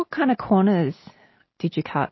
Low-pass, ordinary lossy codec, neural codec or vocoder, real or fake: 7.2 kHz; MP3, 24 kbps; none; real